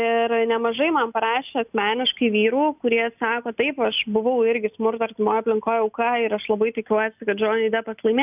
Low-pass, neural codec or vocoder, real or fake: 3.6 kHz; none; real